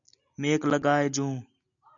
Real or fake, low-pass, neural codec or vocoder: real; 7.2 kHz; none